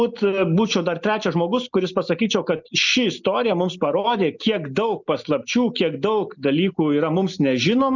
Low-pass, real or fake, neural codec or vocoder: 7.2 kHz; real; none